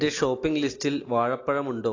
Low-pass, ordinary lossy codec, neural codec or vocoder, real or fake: 7.2 kHz; AAC, 32 kbps; none; real